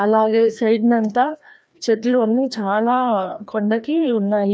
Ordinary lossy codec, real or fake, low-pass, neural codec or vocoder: none; fake; none; codec, 16 kHz, 1 kbps, FreqCodec, larger model